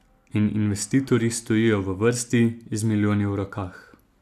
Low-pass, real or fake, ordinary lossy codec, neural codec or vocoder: 14.4 kHz; real; none; none